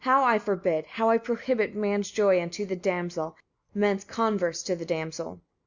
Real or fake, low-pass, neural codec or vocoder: real; 7.2 kHz; none